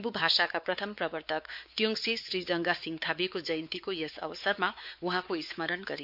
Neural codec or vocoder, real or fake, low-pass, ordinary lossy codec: codec, 16 kHz, 4 kbps, X-Codec, WavLM features, trained on Multilingual LibriSpeech; fake; 5.4 kHz; MP3, 48 kbps